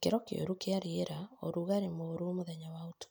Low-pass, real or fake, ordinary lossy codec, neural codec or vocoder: none; real; none; none